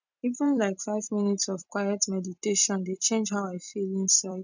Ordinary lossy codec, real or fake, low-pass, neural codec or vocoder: none; fake; 7.2 kHz; vocoder, 44.1 kHz, 128 mel bands every 256 samples, BigVGAN v2